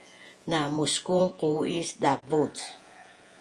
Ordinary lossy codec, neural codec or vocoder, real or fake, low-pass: Opus, 32 kbps; vocoder, 48 kHz, 128 mel bands, Vocos; fake; 10.8 kHz